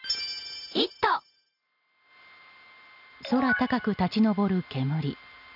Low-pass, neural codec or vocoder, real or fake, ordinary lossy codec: 5.4 kHz; none; real; none